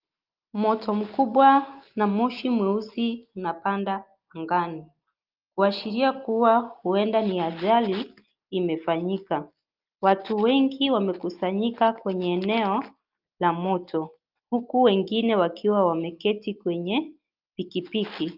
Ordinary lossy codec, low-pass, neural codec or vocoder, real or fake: Opus, 32 kbps; 5.4 kHz; none; real